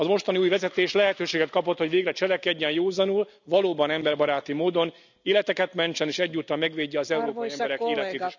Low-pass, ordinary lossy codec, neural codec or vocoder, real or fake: 7.2 kHz; none; none; real